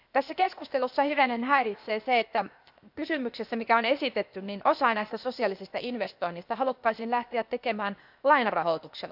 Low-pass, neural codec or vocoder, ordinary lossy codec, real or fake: 5.4 kHz; codec, 16 kHz, 0.8 kbps, ZipCodec; none; fake